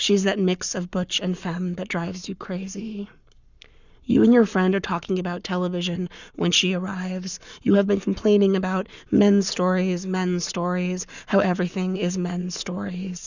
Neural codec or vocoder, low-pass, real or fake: codec, 44.1 kHz, 7.8 kbps, Pupu-Codec; 7.2 kHz; fake